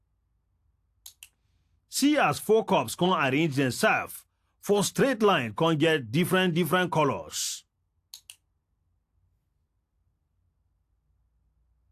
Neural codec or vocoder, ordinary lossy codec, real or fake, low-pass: none; AAC, 64 kbps; real; 14.4 kHz